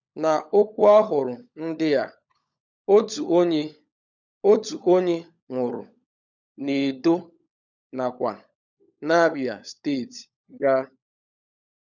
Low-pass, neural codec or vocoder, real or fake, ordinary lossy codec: 7.2 kHz; codec, 16 kHz, 16 kbps, FunCodec, trained on LibriTTS, 50 frames a second; fake; none